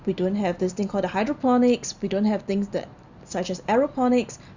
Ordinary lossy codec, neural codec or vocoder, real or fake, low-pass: Opus, 64 kbps; none; real; 7.2 kHz